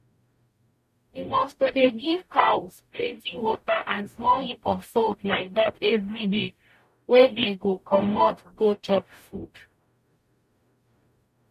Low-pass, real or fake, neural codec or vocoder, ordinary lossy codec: 14.4 kHz; fake; codec, 44.1 kHz, 0.9 kbps, DAC; MP3, 64 kbps